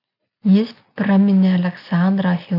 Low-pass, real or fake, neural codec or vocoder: 5.4 kHz; real; none